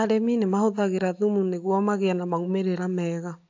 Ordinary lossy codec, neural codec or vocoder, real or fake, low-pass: AAC, 48 kbps; none; real; 7.2 kHz